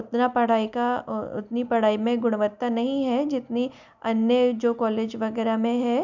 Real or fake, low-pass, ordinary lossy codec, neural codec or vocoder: real; 7.2 kHz; none; none